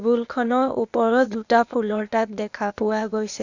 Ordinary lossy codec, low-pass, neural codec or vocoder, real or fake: Opus, 64 kbps; 7.2 kHz; codec, 16 kHz, 0.8 kbps, ZipCodec; fake